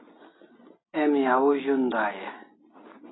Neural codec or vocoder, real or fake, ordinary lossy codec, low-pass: none; real; AAC, 16 kbps; 7.2 kHz